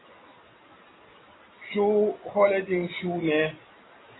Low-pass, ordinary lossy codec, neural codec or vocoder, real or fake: 7.2 kHz; AAC, 16 kbps; none; real